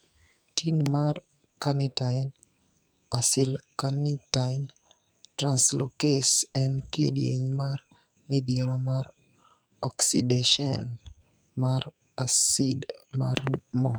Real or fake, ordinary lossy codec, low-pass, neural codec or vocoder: fake; none; none; codec, 44.1 kHz, 2.6 kbps, SNAC